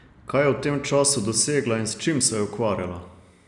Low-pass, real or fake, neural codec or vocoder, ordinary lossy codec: 10.8 kHz; real; none; none